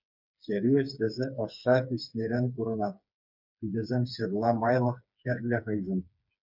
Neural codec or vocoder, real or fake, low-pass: codec, 16 kHz, 4 kbps, FreqCodec, smaller model; fake; 5.4 kHz